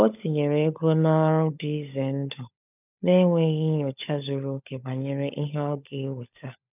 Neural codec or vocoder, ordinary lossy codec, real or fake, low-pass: codec, 16 kHz, 16 kbps, FunCodec, trained on LibriTTS, 50 frames a second; none; fake; 3.6 kHz